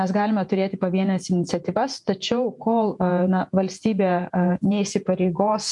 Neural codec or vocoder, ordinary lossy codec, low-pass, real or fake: vocoder, 48 kHz, 128 mel bands, Vocos; MP3, 64 kbps; 10.8 kHz; fake